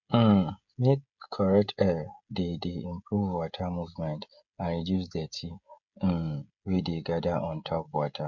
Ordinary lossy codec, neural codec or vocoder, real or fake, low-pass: none; codec, 16 kHz, 16 kbps, FreqCodec, smaller model; fake; 7.2 kHz